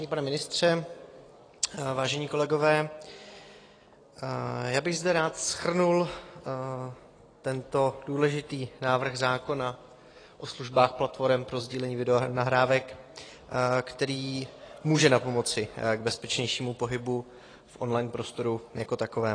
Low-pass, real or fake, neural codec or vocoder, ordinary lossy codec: 9.9 kHz; real; none; AAC, 32 kbps